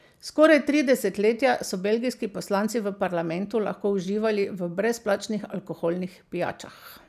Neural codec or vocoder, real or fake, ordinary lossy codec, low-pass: none; real; none; 14.4 kHz